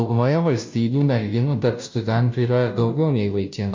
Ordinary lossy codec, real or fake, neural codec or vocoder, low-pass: MP3, 48 kbps; fake; codec, 16 kHz, 0.5 kbps, FunCodec, trained on Chinese and English, 25 frames a second; 7.2 kHz